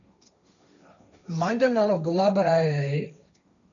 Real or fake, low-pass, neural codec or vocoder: fake; 7.2 kHz; codec, 16 kHz, 1.1 kbps, Voila-Tokenizer